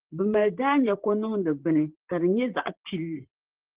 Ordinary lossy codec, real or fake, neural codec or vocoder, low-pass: Opus, 16 kbps; fake; vocoder, 44.1 kHz, 128 mel bands, Pupu-Vocoder; 3.6 kHz